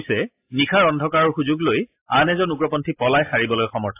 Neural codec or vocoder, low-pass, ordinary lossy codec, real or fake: none; 3.6 kHz; AAC, 24 kbps; real